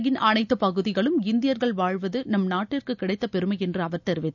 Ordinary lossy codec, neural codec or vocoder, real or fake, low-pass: none; none; real; 7.2 kHz